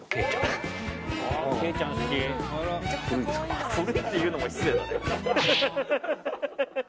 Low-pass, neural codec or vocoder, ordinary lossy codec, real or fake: none; none; none; real